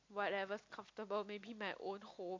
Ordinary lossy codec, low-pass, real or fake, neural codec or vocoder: MP3, 48 kbps; 7.2 kHz; real; none